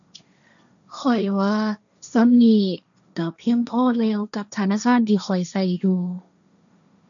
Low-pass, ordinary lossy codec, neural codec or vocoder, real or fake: 7.2 kHz; none; codec, 16 kHz, 1.1 kbps, Voila-Tokenizer; fake